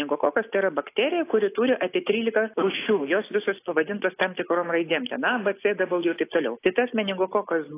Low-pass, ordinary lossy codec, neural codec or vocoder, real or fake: 3.6 kHz; AAC, 24 kbps; none; real